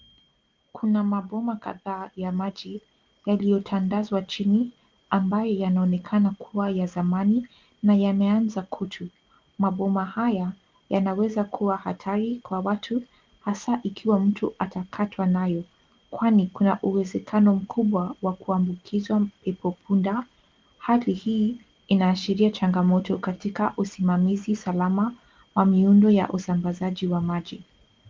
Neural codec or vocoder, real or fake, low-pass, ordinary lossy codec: none; real; 7.2 kHz; Opus, 32 kbps